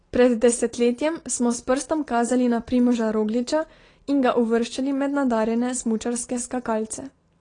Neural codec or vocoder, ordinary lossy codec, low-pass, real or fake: none; AAC, 32 kbps; 9.9 kHz; real